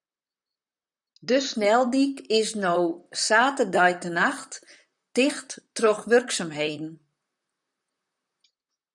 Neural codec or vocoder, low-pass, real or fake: vocoder, 44.1 kHz, 128 mel bands, Pupu-Vocoder; 10.8 kHz; fake